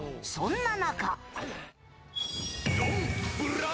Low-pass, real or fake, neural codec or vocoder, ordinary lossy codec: none; real; none; none